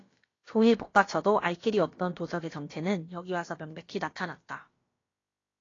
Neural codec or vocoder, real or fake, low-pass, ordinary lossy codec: codec, 16 kHz, about 1 kbps, DyCAST, with the encoder's durations; fake; 7.2 kHz; AAC, 32 kbps